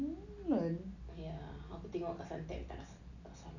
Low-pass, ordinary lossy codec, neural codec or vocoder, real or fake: 7.2 kHz; none; none; real